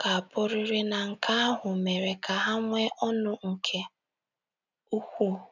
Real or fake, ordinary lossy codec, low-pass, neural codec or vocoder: real; none; 7.2 kHz; none